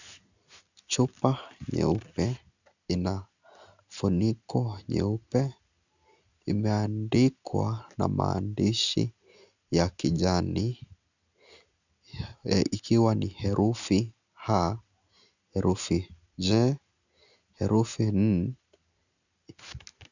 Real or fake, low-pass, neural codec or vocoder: real; 7.2 kHz; none